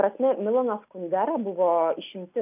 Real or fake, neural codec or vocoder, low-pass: real; none; 3.6 kHz